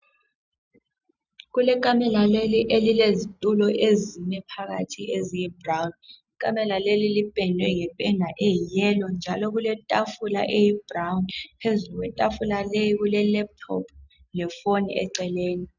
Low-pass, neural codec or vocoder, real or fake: 7.2 kHz; none; real